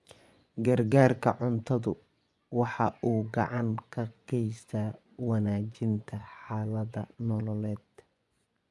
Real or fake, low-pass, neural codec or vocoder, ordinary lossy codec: fake; none; vocoder, 24 kHz, 100 mel bands, Vocos; none